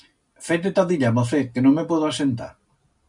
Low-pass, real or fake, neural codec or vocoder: 10.8 kHz; real; none